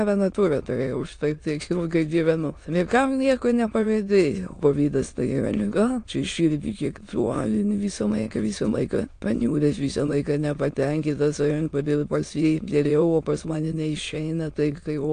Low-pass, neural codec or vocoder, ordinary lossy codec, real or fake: 9.9 kHz; autoencoder, 22.05 kHz, a latent of 192 numbers a frame, VITS, trained on many speakers; AAC, 48 kbps; fake